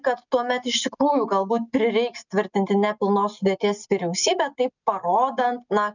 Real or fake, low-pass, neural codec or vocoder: real; 7.2 kHz; none